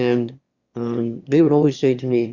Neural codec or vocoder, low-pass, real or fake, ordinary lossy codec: autoencoder, 22.05 kHz, a latent of 192 numbers a frame, VITS, trained on one speaker; 7.2 kHz; fake; Opus, 64 kbps